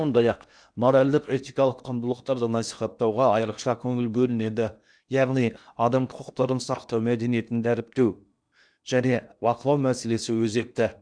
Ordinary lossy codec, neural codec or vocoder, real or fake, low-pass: none; codec, 16 kHz in and 24 kHz out, 0.8 kbps, FocalCodec, streaming, 65536 codes; fake; 9.9 kHz